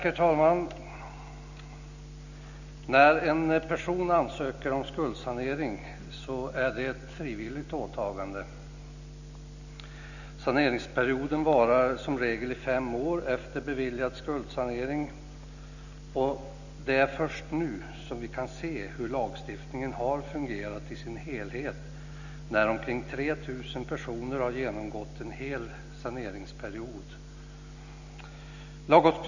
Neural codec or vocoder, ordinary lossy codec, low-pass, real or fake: none; none; 7.2 kHz; real